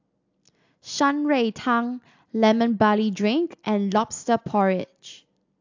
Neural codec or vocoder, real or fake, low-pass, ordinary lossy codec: none; real; 7.2 kHz; AAC, 48 kbps